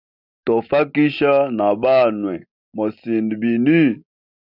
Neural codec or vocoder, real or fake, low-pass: none; real; 5.4 kHz